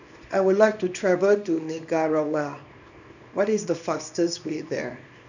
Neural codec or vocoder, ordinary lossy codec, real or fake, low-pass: codec, 24 kHz, 0.9 kbps, WavTokenizer, small release; none; fake; 7.2 kHz